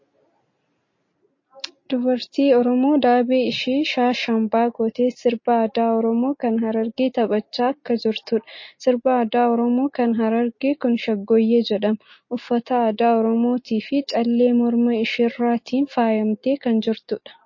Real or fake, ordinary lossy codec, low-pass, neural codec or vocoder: real; MP3, 32 kbps; 7.2 kHz; none